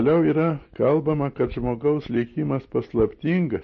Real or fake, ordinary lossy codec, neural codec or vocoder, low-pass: real; MP3, 32 kbps; none; 7.2 kHz